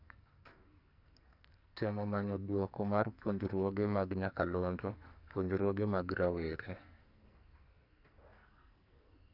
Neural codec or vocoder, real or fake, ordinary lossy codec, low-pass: codec, 44.1 kHz, 2.6 kbps, SNAC; fake; none; 5.4 kHz